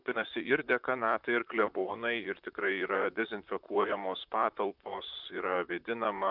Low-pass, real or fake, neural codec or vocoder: 5.4 kHz; fake; vocoder, 44.1 kHz, 128 mel bands, Pupu-Vocoder